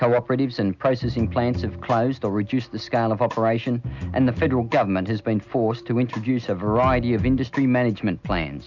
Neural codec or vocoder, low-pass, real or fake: none; 7.2 kHz; real